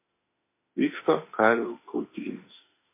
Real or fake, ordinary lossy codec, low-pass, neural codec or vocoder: fake; MP3, 24 kbps; 3.6 kHz; autoencoder, 48 kHz, 32 numbers a frame, DAC-VAE, trained on Japanese speech